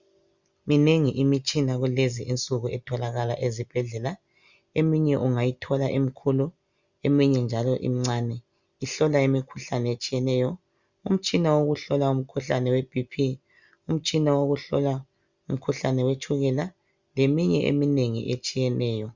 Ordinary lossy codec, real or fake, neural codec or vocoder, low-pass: Opus, 64 kbps; real; none; 7.2 kHz